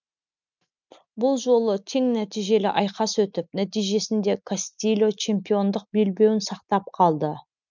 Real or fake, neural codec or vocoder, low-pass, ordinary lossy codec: real; none; 7.2 kHz; none